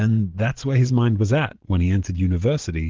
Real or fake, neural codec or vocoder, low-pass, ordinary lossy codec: fake; vocoder, 22.05 kHz, 80 mel bands, Vocos; 7.2 kHz; Opus, 16 kbps